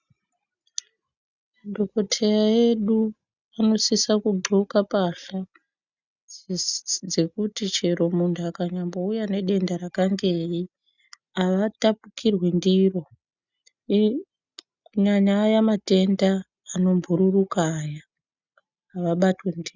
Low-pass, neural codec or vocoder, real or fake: 7.2 kHz; none; real